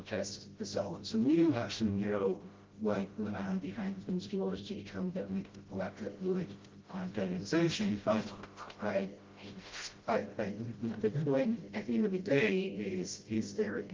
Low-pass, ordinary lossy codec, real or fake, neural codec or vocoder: 7.2 kHz; Opus, 32 kbps; fake; codec, 16 kHz, 0.5 kbps, FreqCodec, smaller model